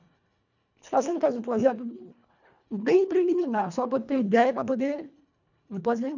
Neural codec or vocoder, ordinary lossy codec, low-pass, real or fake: codec, 24 kHz, 1.5 kbps, HILCodec; none; 7.2 kHz; fake